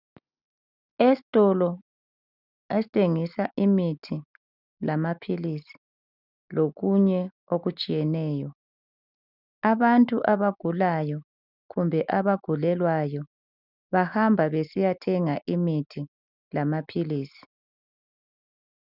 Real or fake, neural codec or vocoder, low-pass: real; none; 5.4 kHz